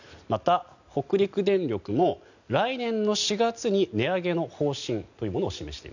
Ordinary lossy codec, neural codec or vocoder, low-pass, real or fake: none; none; 7.2 kHz; real